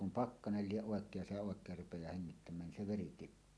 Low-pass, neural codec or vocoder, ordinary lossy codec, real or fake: none; none; none; real